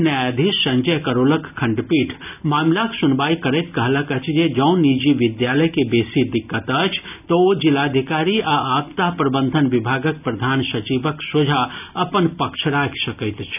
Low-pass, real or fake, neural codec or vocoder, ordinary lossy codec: 3.6 kHz; real; none; none